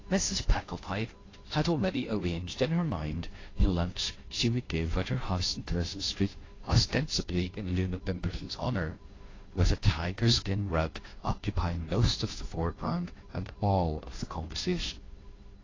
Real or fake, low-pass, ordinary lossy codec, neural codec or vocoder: fake; 7.2 kHz; AAC, 32 kbps; codec, 16 kHz, 0.5 kbps, FunCodec, trained on Chinese and English, 25 frames a second